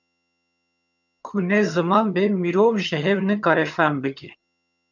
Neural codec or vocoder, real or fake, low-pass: vocoder, 22.05 kHz, 80 mel bands, HiFi-GAN; fake; 7.2 kHz